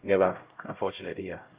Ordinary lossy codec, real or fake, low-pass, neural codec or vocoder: Opus, 24 kbps; fake; 3.6 kHz; codec, 16 kHz, 0.5 kbps, X-Codec, HuBERT features, trained on LibriSpeech